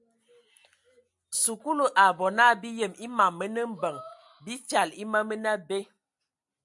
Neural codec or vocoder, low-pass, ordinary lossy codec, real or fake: none; 10.8 kHz; MP3, 96 kbps; real